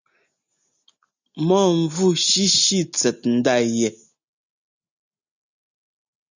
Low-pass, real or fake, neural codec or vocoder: 7.2 kHz; real; none